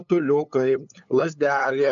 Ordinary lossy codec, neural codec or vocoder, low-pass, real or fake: MP3, 64 kbps; codec, 16 kHz, 8 kbps, FunCodec, trained on LibriTTS, 25 frames a second; 7.2 kHz; fake